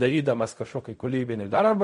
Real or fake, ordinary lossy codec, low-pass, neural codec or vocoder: fake; MP3, 48 kbps; 10.8 kHz; codec, 16 kHz in and 24 kHz out, 0.4 kbps, LongCat-Audio-Codec, fine tuned four codebook decoder